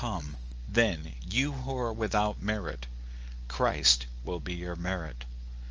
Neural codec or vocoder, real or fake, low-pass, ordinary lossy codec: none; real; 7.2 kHz; Opus, 24 kbps